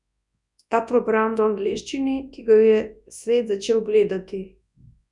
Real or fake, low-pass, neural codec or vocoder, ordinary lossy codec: fake; 10.8 kHz; codec, 24 kHz, 0.9 kbps, WavTokenizer, large speech release; none